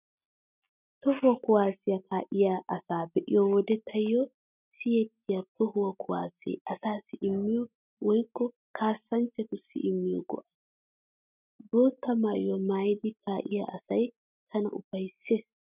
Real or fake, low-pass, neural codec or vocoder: real; 3.6 kHz; none